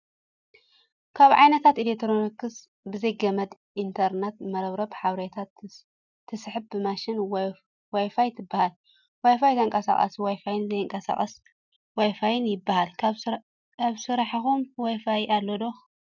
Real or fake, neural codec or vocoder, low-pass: real; none; 7.2 kHz